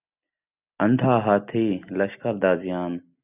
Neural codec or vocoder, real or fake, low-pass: none; real; 3.6 kHz